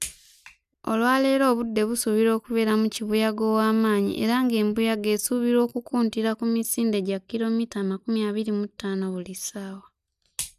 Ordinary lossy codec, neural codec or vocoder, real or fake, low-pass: none; none; real; 14.4 kHz